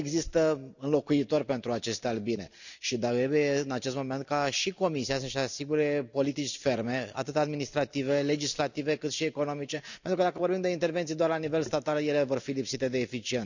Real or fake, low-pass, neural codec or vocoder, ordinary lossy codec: real; 7.2 kHz; none; none